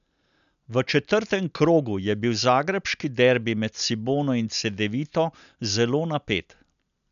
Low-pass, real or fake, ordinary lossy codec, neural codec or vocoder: 7.2 kHz; real; none; none